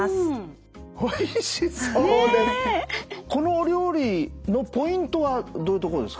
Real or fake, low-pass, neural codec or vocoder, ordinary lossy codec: real; none; none; none